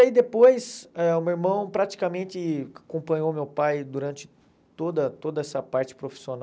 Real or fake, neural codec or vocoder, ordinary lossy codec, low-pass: real; none; none; none